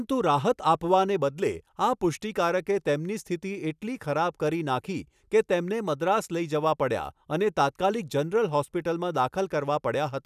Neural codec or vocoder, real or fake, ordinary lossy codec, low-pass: none; real; none; 14.4 kHz